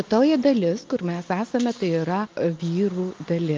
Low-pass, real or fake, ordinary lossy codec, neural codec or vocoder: 7.2 kHz; real; Opus, 24 kbps; none